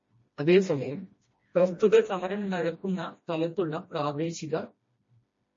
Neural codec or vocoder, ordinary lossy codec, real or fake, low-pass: codec, 16 kHz, 1 kbps, FreqCodec, smaller model; MP3, 32 kbps; fake; 7.2 kHz